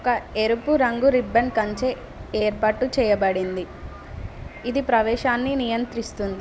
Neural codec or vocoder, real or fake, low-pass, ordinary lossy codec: none; real; none; none